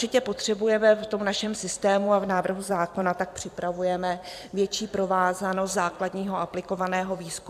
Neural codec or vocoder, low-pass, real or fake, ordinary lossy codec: vocoder, 44.1 kHz, 128 mel bands every 256 samples, BigVGAN v2; 14.4 kHz; fake; AAC, 96 kbps